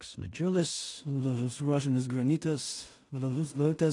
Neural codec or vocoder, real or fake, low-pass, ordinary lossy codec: codec, 16 kHz in and 24 kHz out, 0.4 kbps, LongCat-Audio-Codec, two codebook decoder; fake; 10.8 kHz; AAC, 48 kbps